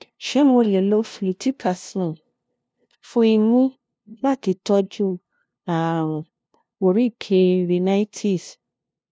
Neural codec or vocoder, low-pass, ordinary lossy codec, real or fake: codec, 16 kHz, 0.5 kbps, FunCodec, trained on LibriTTS, 25 frames a second; none; none; fake